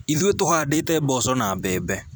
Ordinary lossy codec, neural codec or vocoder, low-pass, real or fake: none; vocoder, 44.1 kHz, 128 mel bands every 256 samples, BigVGAN v2; none; fake